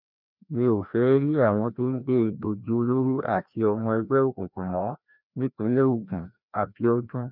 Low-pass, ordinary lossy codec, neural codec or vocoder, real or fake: 5.4 kHz; none; codec, 16 kHz, 1 kbps, FreqCodec, larger model; fake